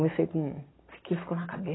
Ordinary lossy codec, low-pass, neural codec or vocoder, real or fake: AAC, 16 kbps; 7.2 kHz; none; real